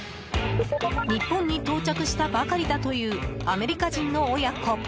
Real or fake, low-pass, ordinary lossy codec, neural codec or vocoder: real; none; none; none